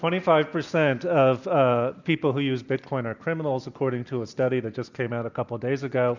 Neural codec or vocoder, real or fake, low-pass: none; real; 7.2 kHz